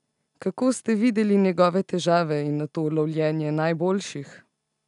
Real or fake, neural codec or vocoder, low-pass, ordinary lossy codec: real; none; 10.8 kHz; none